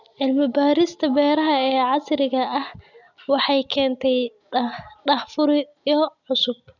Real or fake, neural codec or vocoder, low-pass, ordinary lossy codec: real; none; 7.2 kHz; none